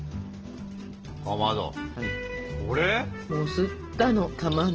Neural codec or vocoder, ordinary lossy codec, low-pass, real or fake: none; Opus, 16 kbps; 7.2 kHz; real